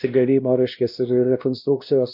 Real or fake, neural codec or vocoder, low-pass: fake; codec, 16 kHz, 1 kbps, X-Codec, WavLM features, trained on Multilingual LibriSpeech; 5.4 kHz